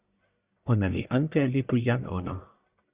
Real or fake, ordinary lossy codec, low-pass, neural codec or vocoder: fake; Opus, 64 kbps; 3.6 kHz; codec, 44.1 kHz, 1.7 kbps, Pupu-Codec